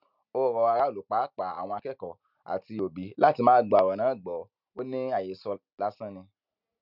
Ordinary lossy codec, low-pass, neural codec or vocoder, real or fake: none; 5.4 kHz; none; real